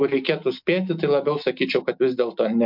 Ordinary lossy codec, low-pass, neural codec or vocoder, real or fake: MP3, 48 kbps; 5.4 kHz; none; real